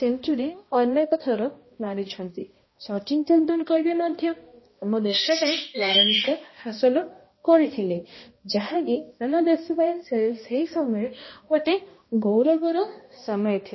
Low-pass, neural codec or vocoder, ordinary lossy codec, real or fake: 7.2 kHz; codec, 16 kHz, 1 kbps, X-Codec, HuBERT features, trained on balanced general audio; MP3, 24 kbps; fake